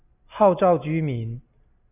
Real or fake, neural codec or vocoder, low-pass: real; none; 3.6 kHz